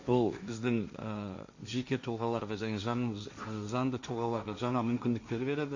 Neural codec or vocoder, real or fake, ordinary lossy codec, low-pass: codec, 16 kHz, 1.1 kbps, Voila-Tokenizer; fake; none; 7.2 kHz